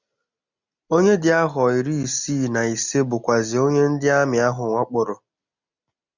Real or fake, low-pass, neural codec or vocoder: real; 7.2 kHz; none